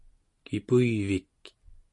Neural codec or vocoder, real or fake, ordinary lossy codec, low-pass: none; real; MP3, 48 kbps; 10.8 kHz